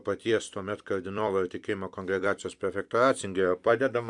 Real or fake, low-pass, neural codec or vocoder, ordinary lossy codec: fake; 10.8 kHz; vocoder, 44.1 kHz, 128 mel bands every 256 samples, BigVGAN v2; MP3, 96 kbps